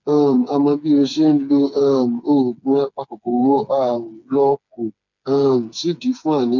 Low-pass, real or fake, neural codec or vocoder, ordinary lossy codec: 7.2 kHz; fake; codec, 16 kHz, 4 kbps, FreqCodec, smaller model; none